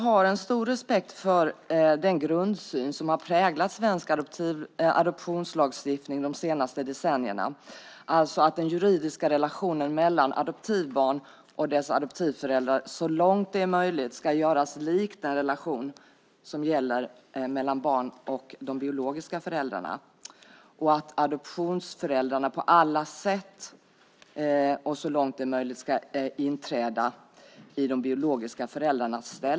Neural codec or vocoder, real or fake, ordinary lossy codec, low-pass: none; real; none; none